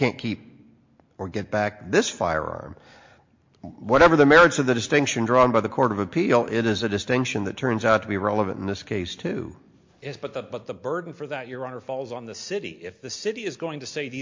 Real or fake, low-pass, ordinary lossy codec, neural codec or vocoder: real; 7.2 kHz; MP3, 48 kbps; none